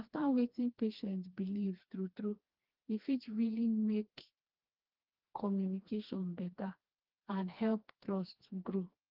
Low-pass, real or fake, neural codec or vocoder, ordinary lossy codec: 5.4 kHz; fake; codec, 16 kHz, 2 kbps, FreqCodec, smaller model; Opus, 32 kbps